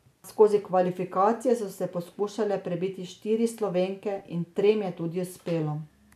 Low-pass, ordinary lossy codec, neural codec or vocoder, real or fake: 14.4 kHz; none; none; real